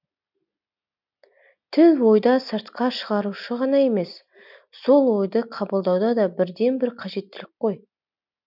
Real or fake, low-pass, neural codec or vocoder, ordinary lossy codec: real; 5.4 kHz; none; none